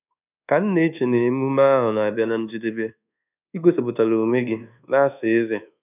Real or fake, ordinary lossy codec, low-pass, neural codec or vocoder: fake; none; 3.6 kHz; codec, 24 kHz, 1.2 kbps, DualCodec